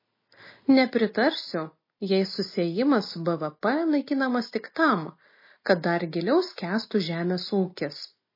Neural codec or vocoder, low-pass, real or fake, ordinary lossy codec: none; 5.4 kHz; real; MP3, 24 kbps